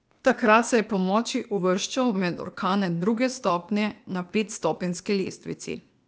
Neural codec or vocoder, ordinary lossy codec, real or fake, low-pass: codec, 16 kHz, 0.8 kbps, ZipCodec; none; fake; none